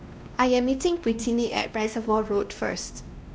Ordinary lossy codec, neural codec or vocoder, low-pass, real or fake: none; codec, 16 kHz, 1 kbps, X-Codec, WavLM features, trained on Multilingual LibriSpeech; none; fake